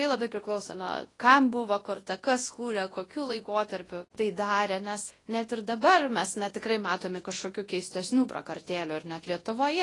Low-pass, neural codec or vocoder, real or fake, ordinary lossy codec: 10.8 kHz; codec, 24 kHz, 0.9 kbps, WavTokenizer, large speech release; fake; AAC, 32 kbps